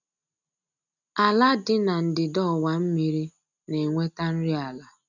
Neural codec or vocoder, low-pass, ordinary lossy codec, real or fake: none; 7.2 kHz; none; real